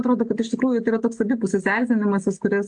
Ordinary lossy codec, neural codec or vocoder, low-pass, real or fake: AAC, 64 kbps; none; 9.9 kHz; real